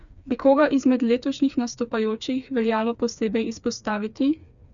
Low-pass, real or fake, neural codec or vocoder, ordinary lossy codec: 7.2 kHz; fake; codec, 16 kHz, 4 kbps, FreqCodec, smaller model; none